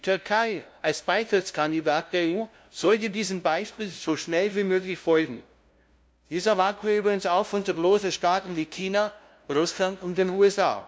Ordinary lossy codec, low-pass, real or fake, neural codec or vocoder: none; none; fake; codec, 16 kHz, 0.5 kbps, FunCodec, trained on LibriTTS, 25 frames a second